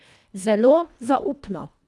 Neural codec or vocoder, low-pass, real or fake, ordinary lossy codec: codec, 24 kHz, 1.5 kbps, HILCodec; none; fake; none